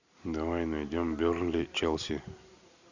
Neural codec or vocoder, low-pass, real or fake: none; 7.2 kHz; real